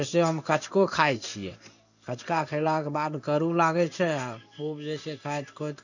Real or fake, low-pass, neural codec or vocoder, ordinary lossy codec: fake; 7.2 kHz; codec, 16 kHz in and 24 kHz out, 1 kbps, XY-Tokenizer; none